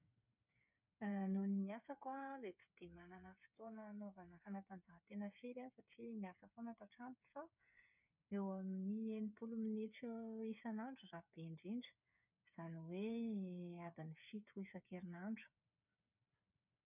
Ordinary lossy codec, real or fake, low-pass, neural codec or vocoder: none; fake; 3.6 kHz; codec, 16 kHz, 8 kbps, FreqCodec, smaller model